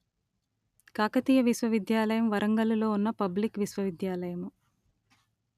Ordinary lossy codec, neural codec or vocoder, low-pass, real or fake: none; none; 14.4 kHz; real